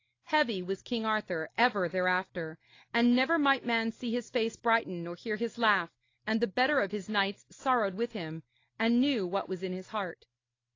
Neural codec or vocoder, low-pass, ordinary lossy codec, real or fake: none; 7.2 kHz; AAC, 32 kbps; real